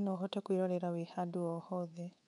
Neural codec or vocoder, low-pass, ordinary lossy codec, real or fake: codec, 24 kHz, 3.1 kbps, DualCodec; 10.8 kHz; none; fake